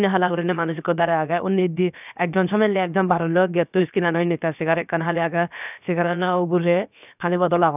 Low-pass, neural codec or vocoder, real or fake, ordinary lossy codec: 3.6 kHz; codec, 16 kHz, about 1 kbps, DyCAST, with the encoder's durations; fake; none